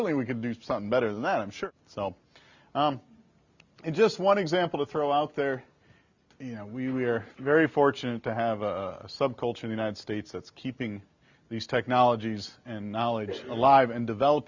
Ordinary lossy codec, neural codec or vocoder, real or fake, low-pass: Opus, 64 kbps; none; real; 7.2 kHz